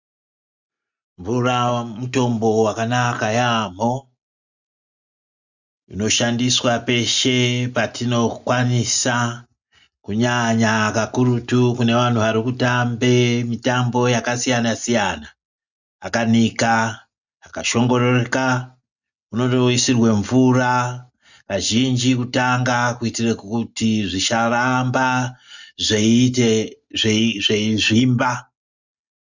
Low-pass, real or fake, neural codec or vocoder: 7.2 kHz; real; none